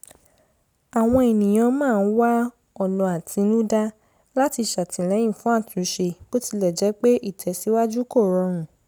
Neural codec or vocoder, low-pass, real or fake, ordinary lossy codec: none; none; real; none